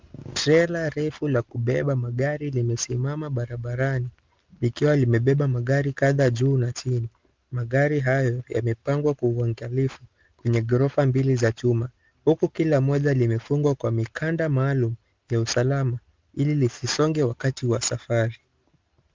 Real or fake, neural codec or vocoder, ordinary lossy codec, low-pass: real; none; Opus, 32 kbps; 7.2 kHz